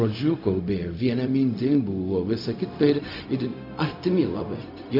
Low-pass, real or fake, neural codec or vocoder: 5.4 kHz; fake; codec, 16 kHz, 0.4 kbps, LongCat-Audio-Codec